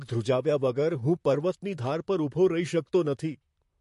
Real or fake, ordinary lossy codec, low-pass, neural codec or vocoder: fake; MP3, 48 kbps; 14.4 kHz; vocoder, 44.1 kHz, 128 mel bands, Pupu-Vocoder